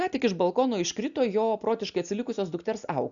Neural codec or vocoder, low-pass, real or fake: none; 7.2 kHz; real